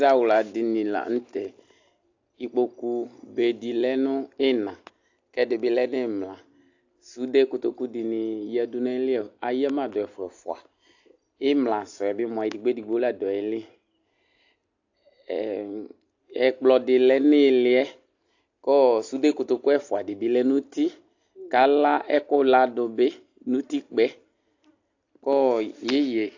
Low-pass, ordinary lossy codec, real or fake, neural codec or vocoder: 7.2 kHz; AAC, 48 kbps; real; none